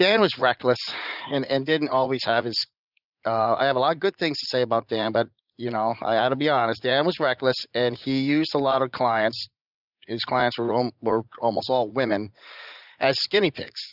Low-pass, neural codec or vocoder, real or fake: 5.4 kHz; vocoder, 44.1 kHz, 80 mel bands, Vocos; fake